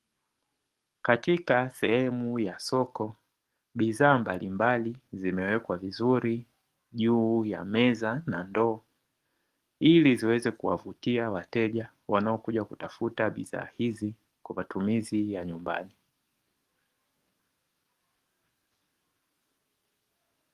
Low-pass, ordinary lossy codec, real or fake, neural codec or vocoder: 14.4 kHz; Opus, 24 kbps; fake; codec, 44.1 kHz, 7.8 kbps, DAC